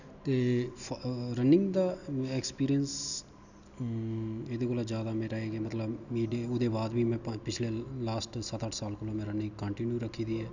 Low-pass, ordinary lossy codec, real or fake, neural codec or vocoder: 7.2 kHz; none; real; none